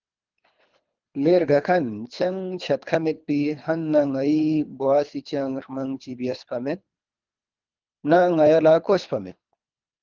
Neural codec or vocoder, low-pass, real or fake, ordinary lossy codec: codec, 24 kHz, 3 kbps, HILCodec; 7.2 kHz; fake; Opus, 24 kbps